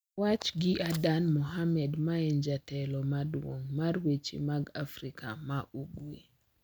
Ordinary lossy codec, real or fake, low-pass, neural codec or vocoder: none; real; none; none